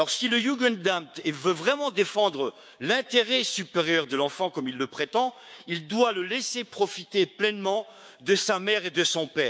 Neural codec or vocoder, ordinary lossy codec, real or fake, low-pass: codec, 16 kHz, 6 kbps, DAC; none; fake; none